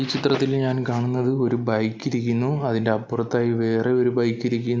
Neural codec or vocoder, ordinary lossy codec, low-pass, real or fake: none; none; none; real